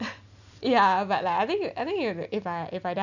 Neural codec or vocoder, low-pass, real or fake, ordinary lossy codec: none; 7.2 kHz; real; none